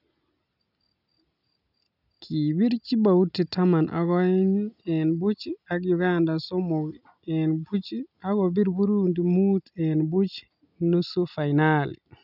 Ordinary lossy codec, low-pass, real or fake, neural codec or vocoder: none; 5.4 kHz; real; none